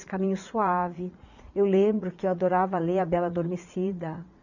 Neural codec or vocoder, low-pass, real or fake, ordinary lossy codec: vocoder, 44.1 kHz, 80 mel bands, Vocos; 7.2 kHz; fake; none